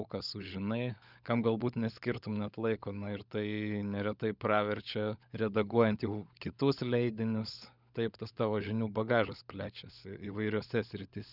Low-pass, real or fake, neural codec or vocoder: 5.4 kHz; fake; codec, 16 kHz, 16 kbps, FunCodec, trained on LibriTTS, 50 frames a second